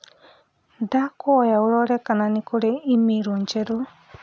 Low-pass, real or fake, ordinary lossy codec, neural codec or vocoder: none; real; none; none